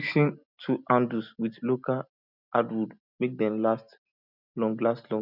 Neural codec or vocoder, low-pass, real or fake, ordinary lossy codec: none; 5.4 kHz; real; none